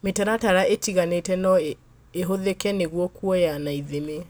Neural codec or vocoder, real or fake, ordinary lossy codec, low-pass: none; real; none; none